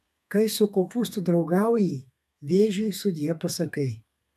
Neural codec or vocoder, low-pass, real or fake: codec, 32 kHz, 1.9 kbps, SNAC; 14.4 kHz; fake